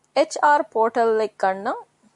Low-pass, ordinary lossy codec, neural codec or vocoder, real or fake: 10.8 kHz; MP3, 96 kbps; none; real